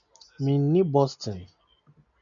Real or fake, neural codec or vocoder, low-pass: real; none; 7.2 kHz